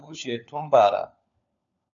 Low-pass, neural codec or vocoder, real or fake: 7.2 kHz; codec, 16 kHz, 4 kbps, FunCodec, trained on LibriTTS, 50 frames a second; fake